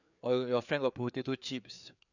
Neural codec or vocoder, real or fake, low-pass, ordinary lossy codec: codec, 16 kHz, 4 kbps, FreqCodec, larger model; fake; 7.2 kHz; none